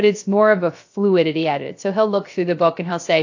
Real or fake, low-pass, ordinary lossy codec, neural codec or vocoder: fake; 7.2 kHz; AAC, 48 kbps; codec, 16 kHz, 0.3 kbps, FocalCodec